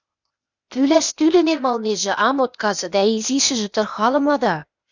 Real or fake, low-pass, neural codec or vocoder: fake; 7.2 kHz; codec, 16 kHz, 0.8 kbps, ZipCodec